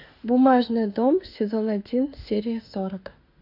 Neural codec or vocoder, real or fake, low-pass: autoencoder, 48 kHz, 32 numbers a frame, DAC-VAE, trained on Japanese speech; fake; 5.4 kHz